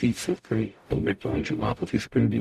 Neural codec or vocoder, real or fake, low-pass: codec, 44.1 kHz, 0.9 kbps, DAC; fake; 14.4 kHz